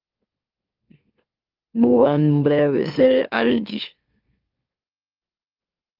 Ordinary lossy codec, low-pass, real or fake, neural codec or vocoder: Opus, 32 kbps; 5.4 kHz; fake; autoencoder, 44.1 kHz, a latent of 192 numbers a frame, MeloTTS